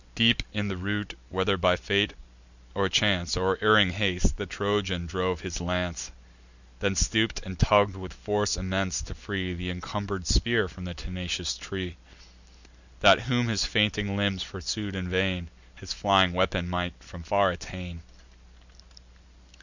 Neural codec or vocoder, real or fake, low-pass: none; real; 7.2 kHz